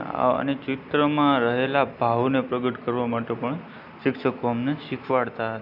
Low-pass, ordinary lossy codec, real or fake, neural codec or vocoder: 5.4 kHz; none; real; none